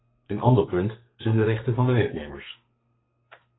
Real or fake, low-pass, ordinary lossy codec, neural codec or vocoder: fake; 7.2 kHz; AAC, 16 kbps; codec, 32 kHz, 1.9 kbps, SNAC